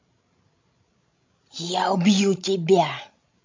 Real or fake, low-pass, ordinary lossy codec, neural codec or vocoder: fake; 7.2 kHz; MP3, 48 kbps; codec, 16 kHz, 16 kbps, FreqCodec, larger model